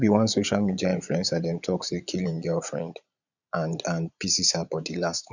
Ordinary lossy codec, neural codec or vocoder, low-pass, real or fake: none; vocoder, 22.05 kHz, 80 mel bands, Vocos; 7.2 kHz; fake